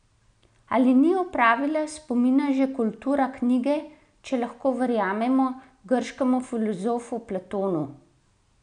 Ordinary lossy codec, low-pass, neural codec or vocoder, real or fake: none; 9.9 kHz; none; real